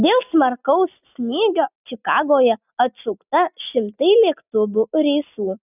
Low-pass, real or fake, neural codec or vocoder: 3.6 kHz; real; none